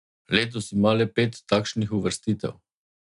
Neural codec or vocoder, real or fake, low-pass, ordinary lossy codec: none; real; 10.8 kHz; none